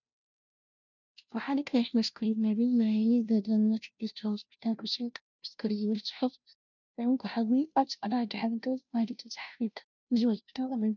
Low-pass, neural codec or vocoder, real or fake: 7.2 kHz; codec, 16 kHz, 0.5 kbps, FunCodec, trained on Chinese and English, 25 frames a second; fake